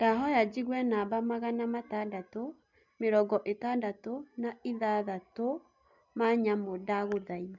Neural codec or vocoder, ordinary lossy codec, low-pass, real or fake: none; MP3, 64 kbps; 7.2 kHz; real